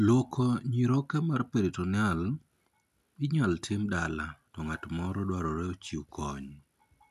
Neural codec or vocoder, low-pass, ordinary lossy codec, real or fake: none; 14.4 kHz; none; real